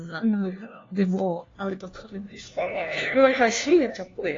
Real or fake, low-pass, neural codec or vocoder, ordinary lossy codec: fake; 7.2 kHz; codec, 16 kHz, 1 kbps, FunCodec, trained on LibriTTS, 50 frames a second; AAC, 32 kbps